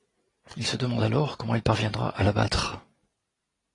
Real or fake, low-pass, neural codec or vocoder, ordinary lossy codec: real; 10.8 kHz; none; AAC, 32 kbps